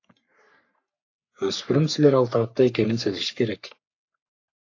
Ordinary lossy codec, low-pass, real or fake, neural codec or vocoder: AAC, 48 kbps; 7.2 kHz; fake; codec, 44.1 kHz, 3.4 kbps, Pupu-Codec